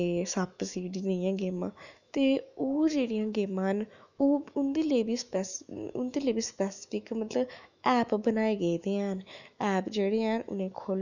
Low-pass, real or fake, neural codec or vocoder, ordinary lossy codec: 7.2 kHz; fake; autoencoder, 48 kHz, 128 numbers a frame, DAC-VAE, trained on Japanese speech; none